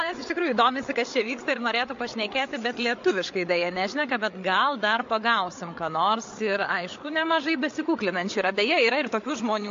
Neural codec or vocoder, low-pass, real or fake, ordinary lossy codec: codec, 16 kHz, 8 kbps, FreqCodec, larger model; 7.2 kHz; fake; AAC, 48 kbps